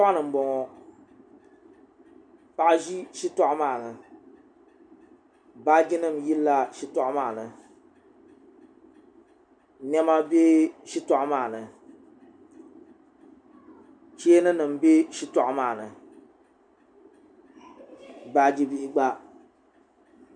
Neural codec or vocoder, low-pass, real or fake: none; 9.9 kHz; real